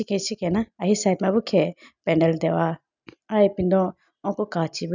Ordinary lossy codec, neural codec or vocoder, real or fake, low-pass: none; none; real; 7.2 kHz